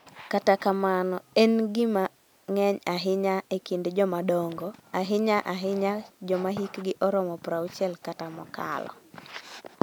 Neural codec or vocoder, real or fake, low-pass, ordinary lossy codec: none; real; none; none